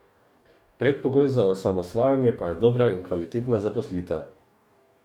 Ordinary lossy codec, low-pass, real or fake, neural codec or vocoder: none; 19.8 kHz; fake; codec, 44.1 kHz, 2.6 kbps, DAC